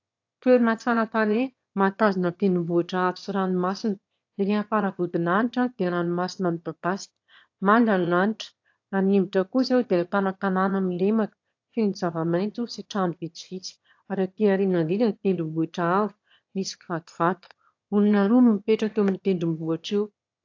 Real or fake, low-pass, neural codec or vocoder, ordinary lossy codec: fake; 7.2 kHz; autoencoder, 22.05 kHz, a latent of 192 numbers a frame, VITS, trained on one speaker; AAC, 48 kbps